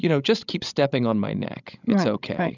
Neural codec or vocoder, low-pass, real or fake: codec, 16 kHz, 16 kbps, FreqCodec, larger model; 7.2 kHz; fake